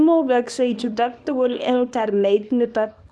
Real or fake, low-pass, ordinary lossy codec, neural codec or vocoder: fake; none; none; codec, 24 kHz, 0.9 kbps, WavTokenizer, small release